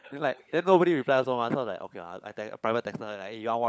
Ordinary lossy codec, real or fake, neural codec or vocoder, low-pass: none; fake; codec, 16 kHz, 16 kbps, FunCodec, trained on LibriTTS, 50 frames a second; none